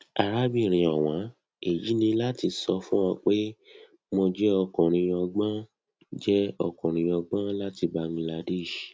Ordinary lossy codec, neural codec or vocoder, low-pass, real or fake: none; none; none; real